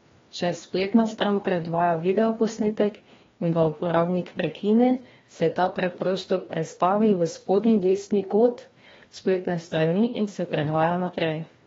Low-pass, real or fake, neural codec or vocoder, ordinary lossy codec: 7.2 kHz; fake; codec, 16 kHz, 1 kbps, FreqCodec, larger model; AAC, 24 kbps